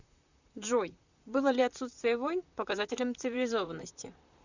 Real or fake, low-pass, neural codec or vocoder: fake; 7.2 kHz; vocoder, 44.1 kHz, 128 mel bands, Pupu-Vocoder